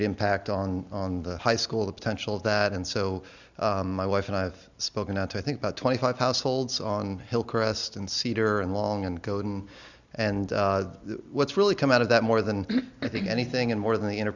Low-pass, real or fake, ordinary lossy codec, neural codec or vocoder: 7.2 kHz; real; Opus, 64 kbps; none